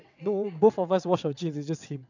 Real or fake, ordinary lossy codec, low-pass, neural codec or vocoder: fake; none; 7.2 kHz; vocoder, 22.05 kHz, 80 mel bands, WaveNeXt